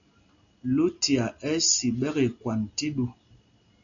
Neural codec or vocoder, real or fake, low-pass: none; real; 7.2 kHz